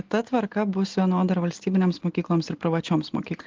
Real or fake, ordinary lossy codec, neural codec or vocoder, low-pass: real; Opus, 16 kbps; none; 7.2 kHz